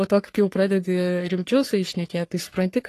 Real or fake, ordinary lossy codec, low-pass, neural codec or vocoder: fake; AAC, 48 kbps; 14.4 kHz; codec, 44.1 kHz, 3.4 kbps, Pupu-Codec